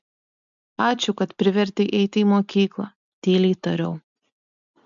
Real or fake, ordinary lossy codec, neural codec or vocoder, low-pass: real; MP3, 64 kbps; none; 7.2 kHz